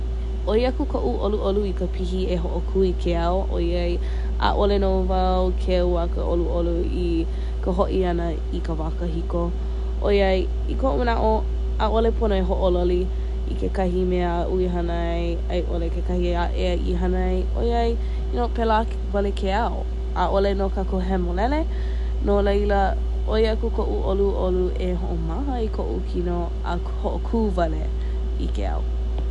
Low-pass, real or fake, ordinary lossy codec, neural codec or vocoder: 10.8 kHz; real; none; none